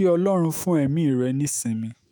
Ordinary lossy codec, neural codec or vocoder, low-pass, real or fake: none; autoencoder, 48 kHz, 128 numbers a frame, DAC-VAE, trained on Japanese speech; none; fake